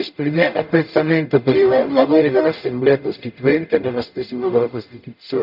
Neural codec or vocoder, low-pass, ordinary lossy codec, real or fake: codec, 44.1 kHz, 0.9 kbps, DAC; 5.4 kHz; none; fake